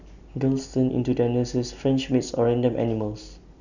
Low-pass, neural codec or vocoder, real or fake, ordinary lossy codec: 7.2 kHz; none; real; none